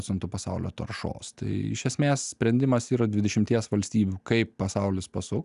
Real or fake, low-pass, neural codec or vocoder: real; 10.8 kHz; none